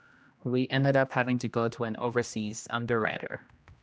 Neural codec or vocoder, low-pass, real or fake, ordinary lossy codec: codec, 16 kHz, 1 kbps, X-Codec, HuBERT features, trained on general audio; none; fake; none